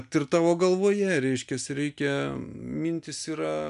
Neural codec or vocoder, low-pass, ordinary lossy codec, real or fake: none; 10.8 kHz; MP3, 96 kbps; real